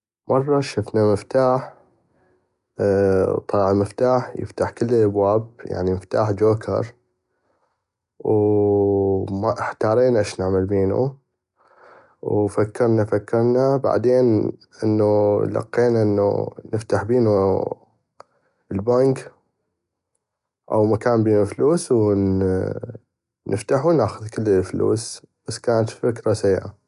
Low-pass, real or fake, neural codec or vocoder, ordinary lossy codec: 10.8 kHz; real; none; none